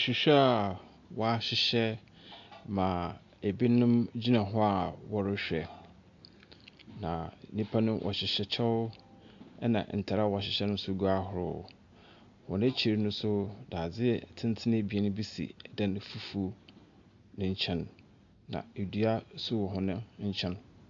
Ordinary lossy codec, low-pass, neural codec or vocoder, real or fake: AAC, 64 kbps; 7.2 kHz; none; real